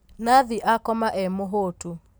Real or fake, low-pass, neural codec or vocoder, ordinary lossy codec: real; none; none; none